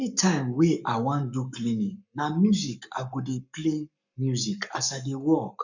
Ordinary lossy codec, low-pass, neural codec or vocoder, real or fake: none; 7.2 kHz; codec, 44.1 kHz, 7.8 kbps, Pupu-Codec; fake